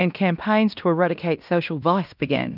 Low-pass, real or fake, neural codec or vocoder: 5.4 kHz; fake; codec, 16 kHz in and 24 kHz out, 0.9 kbps, LongCat-Audio-Codec, fine tuned four codebook decoder